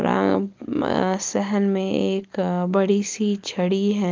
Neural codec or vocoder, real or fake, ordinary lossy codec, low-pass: none; real; Opus, 24 kbps; 7.2 kHz